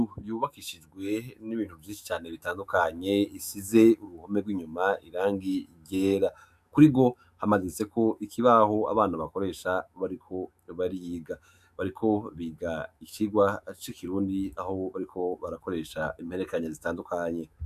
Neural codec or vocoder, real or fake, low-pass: autoencoder, 48 kHz, 128 numbers a frame, DAC-VAE, trained on Japanese speech; fake; 14.4 kHz